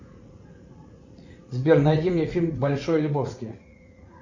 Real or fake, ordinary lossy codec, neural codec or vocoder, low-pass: fake; Opus, 64 kbps; vocoder, 44.1 kHz, 80 mel bands, Vocos; 7.2 kHz